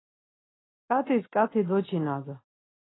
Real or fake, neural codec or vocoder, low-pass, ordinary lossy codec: real; none; 7.2 kHz; AAC, 16 kbps